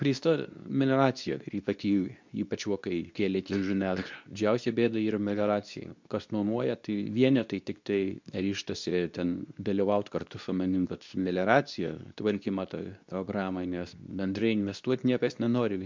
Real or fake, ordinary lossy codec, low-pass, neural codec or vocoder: fake; MP3, 64 kbps; 7.2 kHz; codec, 24 kHz, 0.9 kbps, WavTokenizer, medium speech release version 2